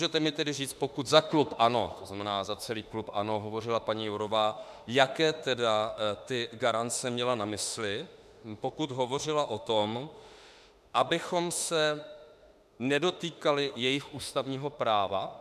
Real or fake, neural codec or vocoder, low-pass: fake; autoencoder, 48 kHz, 32 numbers a frame, DAC-VAE, trained on Japanese speech; 14.4 kHz